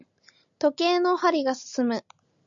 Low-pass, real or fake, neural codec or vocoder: 7.2 kHz; real; none